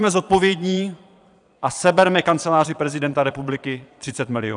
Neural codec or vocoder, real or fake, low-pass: vocoder, 22.05 kHz, 80 mel bands, Vocos; fake; 9.9 kHz